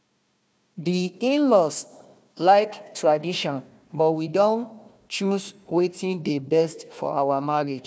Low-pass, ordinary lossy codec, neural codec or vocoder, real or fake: none; none; codec, 16 kHz, 1 kbps, FunCodec, trained on Chinese and English, 50 frames a second; fake